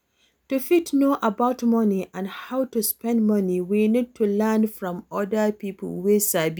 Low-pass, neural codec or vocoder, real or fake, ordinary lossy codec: none; none; real; none